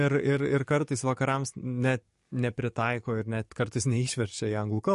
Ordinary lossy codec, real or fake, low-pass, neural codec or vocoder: MP3, 48 kbps; fake; 14.4 kHz; codec, 44.1 kHz, 7.8 kbps, DAC